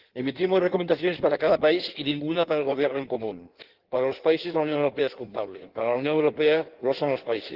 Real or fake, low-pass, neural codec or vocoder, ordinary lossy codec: fake; 5.4 kHz; codec, 16 kHz in and 24 kHz out, 1.1 kbps, FireRedTTS-2 codec; Opus, 16 kbps